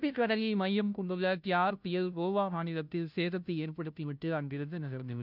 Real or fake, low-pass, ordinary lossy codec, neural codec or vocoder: fake; 5.4 kHz; none; codec, 16 kHz, 0.5 kbps, FunCodec, trained on Chinese and English, 25 frames a second